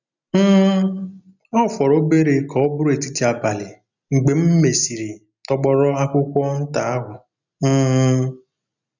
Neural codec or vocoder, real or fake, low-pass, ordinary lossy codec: none; real; 7.2 kHz; none